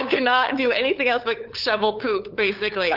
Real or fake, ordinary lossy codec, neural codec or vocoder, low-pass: fake; Opus, 24 kbps; codec, 16 kHz, 8 kbps, FunCodec, trained on LibriTTS, 25 frames a second; 5.4 kHz